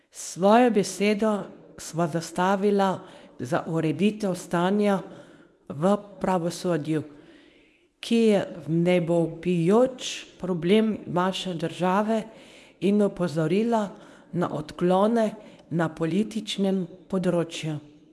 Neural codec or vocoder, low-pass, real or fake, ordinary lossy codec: codec, 24 kHz, 0.9 kbps, WavTokenizer, medium speech release version 1; none; fake; none